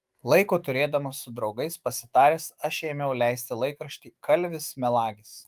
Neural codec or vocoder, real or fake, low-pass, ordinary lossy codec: autoencoder, 48 kHz, 128 numbers a frame, DAC-VAE, trained on Japanese speech; fake; 14.4 kHz; Opus, 32 kbps